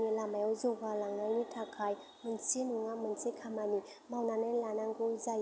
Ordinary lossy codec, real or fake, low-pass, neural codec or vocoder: none; real; none; none